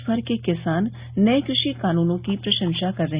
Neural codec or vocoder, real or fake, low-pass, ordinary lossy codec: none; real; 3.6 kHz; Opus, 64 kbps